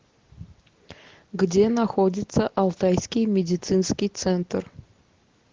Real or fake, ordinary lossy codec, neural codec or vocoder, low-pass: real; Opus, 16 kbps; none; 7.2 kHz